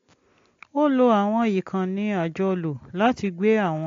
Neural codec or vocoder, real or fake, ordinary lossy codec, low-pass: none; real; AAC, 48 kbps; 7.2 kHz